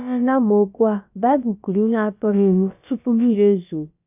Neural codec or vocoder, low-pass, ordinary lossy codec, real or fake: codec, 16 kHz, about 1 kbps, DyCAST, with the encoder's durations; 3.6 kHz; AAC, 32 kbps; fake